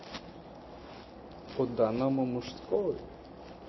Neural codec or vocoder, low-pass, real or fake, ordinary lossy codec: none; 7.2 kHz; real; MP3, 24 kbps